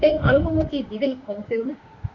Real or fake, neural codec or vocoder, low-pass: fake; autoencoder, 48 kHz, 32 numbers a frame, DAC-VAE, trained on Japanese speech; 7.2 kHz